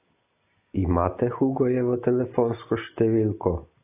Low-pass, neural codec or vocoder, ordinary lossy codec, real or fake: 3.6 kHz; none; AAC, 24 kbps; real